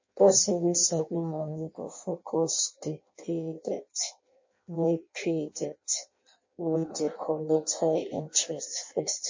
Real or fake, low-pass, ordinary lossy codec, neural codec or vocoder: fake; 7.2 kHz; MP3, 32 kbps; codec, 16 kHz in and 24 kHz out, 0.6 kbps, FireRedTTS-2 codec